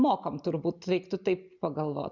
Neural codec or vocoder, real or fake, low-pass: none; real; 7.2 kHz